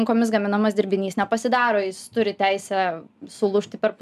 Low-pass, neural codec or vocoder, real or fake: 14.4 kHz; none; real